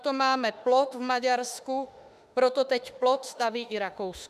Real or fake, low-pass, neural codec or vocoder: fake; 14.4 kHz; autoencoder, 48 kHz, 32 numbers a frame, DAC-VAE, trained on Japanese speech